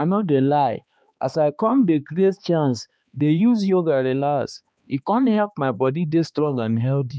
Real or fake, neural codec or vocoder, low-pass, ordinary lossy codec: fake; codec, 16 kHz, 2 kbps, X-Codec, HuBERT features, trained on balanced general audio; none; none